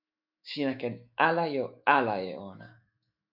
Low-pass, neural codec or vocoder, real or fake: 5.4 kHz; autoencoder, 48 kHz, 128 numbers a frame, DAC-VAE, trained on Japanese speech; fake